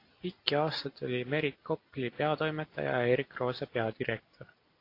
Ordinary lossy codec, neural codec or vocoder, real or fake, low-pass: AAC, 32 kbps; none; real; 5.4 kHz